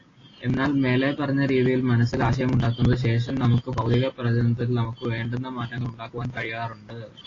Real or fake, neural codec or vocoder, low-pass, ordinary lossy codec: real; none; 7.2 kHz; AAC, 32 kbps